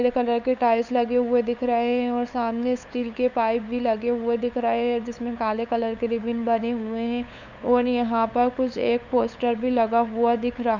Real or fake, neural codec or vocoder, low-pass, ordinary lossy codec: fake; codec, 16 kHz, 8 kbps, FunCodec, trained on LibriTTS, 25 frames a second; 7.2 kHz; none